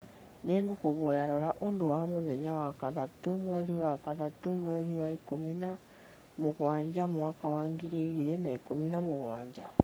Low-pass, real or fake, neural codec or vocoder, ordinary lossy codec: none; fake; codec, 44.1 kHz, 3.4 kbps, Pupu-Codec; none